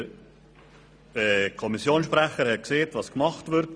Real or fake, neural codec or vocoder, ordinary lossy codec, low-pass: real; none; none; none